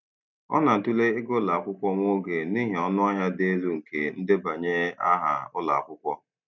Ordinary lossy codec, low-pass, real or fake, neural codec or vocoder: none; 7.2 kHz; real; none